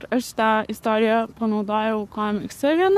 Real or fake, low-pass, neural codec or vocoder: fake; 14.4 kHz; codec, 44.1 kHz, 7.8 kbps, Pupu-Codec